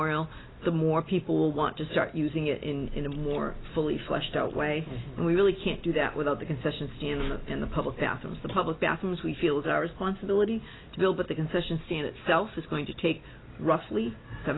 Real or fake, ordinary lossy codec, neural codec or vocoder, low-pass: real; AAC, 16 kbps; none; 7.2 kHz